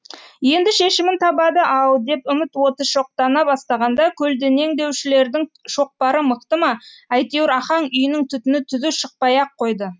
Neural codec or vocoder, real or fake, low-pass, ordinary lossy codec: none; real; none; none